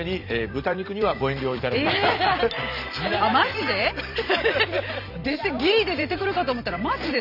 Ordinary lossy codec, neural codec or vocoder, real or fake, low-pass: none; vocoder, 44.1 kHz, 128 mel bands every 256 samples, BigVGAN v2; fake; 5.4 kHz